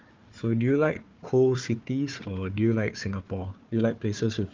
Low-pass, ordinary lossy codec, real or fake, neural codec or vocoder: 7.2 kHz; Opus, 32 kbps; fake; codec, 16 kHz, 4 kbps, FunCodec, trained on Chinese and English, 50 frames a second